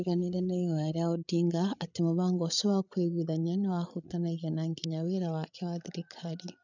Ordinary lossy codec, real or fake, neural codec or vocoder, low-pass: none; fake; codec, 16 kHz, 8 kbps, FreqCodec, larger model; 7.2 kHz